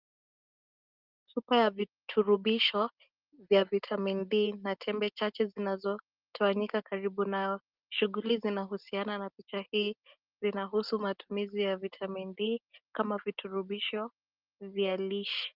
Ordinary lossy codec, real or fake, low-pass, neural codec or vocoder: Opus, 32 kbps; real; 5.4 kHz; none